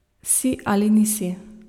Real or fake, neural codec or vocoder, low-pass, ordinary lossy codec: real; none; 19.8 kHz; none